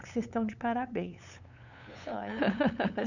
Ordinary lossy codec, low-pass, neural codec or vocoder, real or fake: none; 7.2 kHz; codec, 16 kHz, 8 kbps, FunCodec, trained on LibriTTS, 25 frames a second; fake